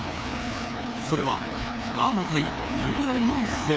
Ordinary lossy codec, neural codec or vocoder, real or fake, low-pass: none; codec, 16 kHz, 2 kbps, FreqCodec, larger model; fake; none